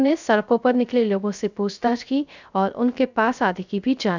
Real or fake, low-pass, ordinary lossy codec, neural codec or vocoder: fake; 7.2 kHz; none; codec, 16 kHz, 0.3 kbps, FocalCodec